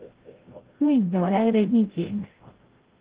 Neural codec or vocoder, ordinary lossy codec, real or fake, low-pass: codec, 16 kHz, 0.5 kbps, FreqCodec, larger model; Opus, 16 kbps; fake; 3.6 kHz